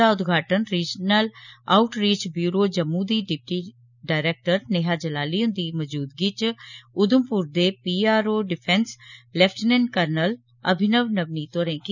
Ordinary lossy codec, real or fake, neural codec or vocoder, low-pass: none; real; none; 7.2 kHz